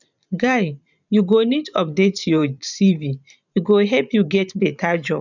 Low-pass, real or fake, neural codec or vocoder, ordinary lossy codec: 7.2 kHz; fake; vocoder, 44.1 kHz, 128 mel bands every 512 samples, BigVGAN v2; none